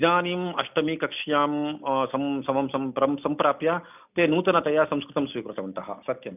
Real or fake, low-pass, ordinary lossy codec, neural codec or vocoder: real; 3.6 kHz; none; none